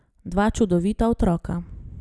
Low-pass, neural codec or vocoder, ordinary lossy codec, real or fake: none; none; none; real